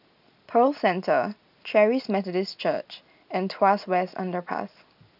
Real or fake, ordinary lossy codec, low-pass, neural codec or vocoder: real; none; 5.4 kHz; none